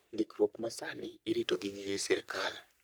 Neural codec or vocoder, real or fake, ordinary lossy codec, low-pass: codec, 44.1 kHz, 3.4 kbps, Pupu-Codec; fake; none; none